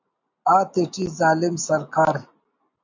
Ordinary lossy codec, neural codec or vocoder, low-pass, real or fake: MP3, 48 kbps; none; 7.2 kHz; real